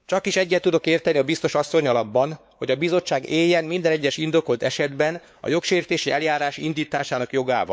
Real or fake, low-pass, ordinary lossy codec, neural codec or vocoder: fake; none; none; codec, 16 kHz, 4 kbps, X-Codec, WavLM features, trained on Multilingual LibriSpeech